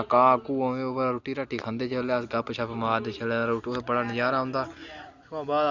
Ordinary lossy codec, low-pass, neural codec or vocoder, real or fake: Opus, 64 kbps; 7.2 kHz; none; real